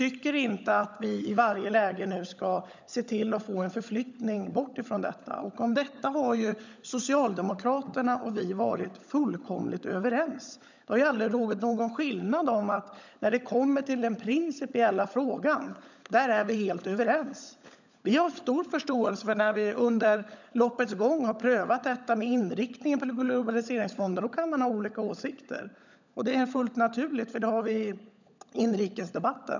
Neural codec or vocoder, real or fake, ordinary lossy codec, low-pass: codec, 16 kHz, 16 kbps, FunCodec, trained on LibriTTS, 50 frames a second; fake; none; 7.2 kHz